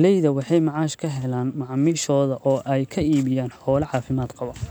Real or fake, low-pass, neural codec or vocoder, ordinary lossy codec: real; none; none; none